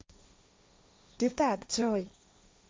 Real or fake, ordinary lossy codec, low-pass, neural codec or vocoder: fake; none; none; codec, 16 kHz, 1.1 kbps, Voila-Tokenizer